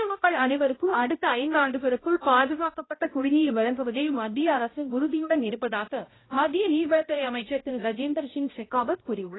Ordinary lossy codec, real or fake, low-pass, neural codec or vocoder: AAC, 16 kbps; fake; 7.2 kHz; codec, 16 kHz, 0.5 kbps, X-Codec, HuBERT features, trained on balanced general audio